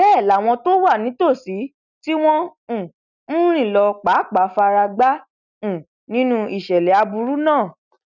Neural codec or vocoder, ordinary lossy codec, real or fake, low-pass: none; none; real; 7.2 kHz